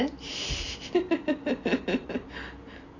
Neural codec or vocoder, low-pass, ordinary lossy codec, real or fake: none; 7.2 kHz; AAC, 32 kbps; real